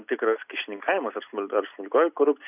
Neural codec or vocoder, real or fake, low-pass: none; real; 3.6 kHz